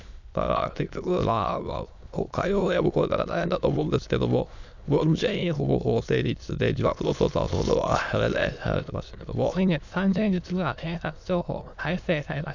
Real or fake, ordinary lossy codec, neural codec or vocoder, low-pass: fake; none; autoencoder, 22.05 kHz, a latent of 192 numbers a frame, VITS, trained on many speakers; 7.2 kHz